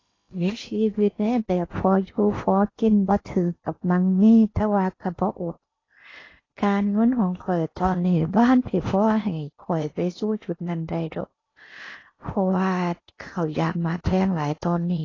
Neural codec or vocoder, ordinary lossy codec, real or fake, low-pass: codec, 16 kHz in and 24 kHz out, 0.8 kbps, FocalCodec, streaming, 65536 codes; AAC, 32 kbps; fake; 7.2 kHz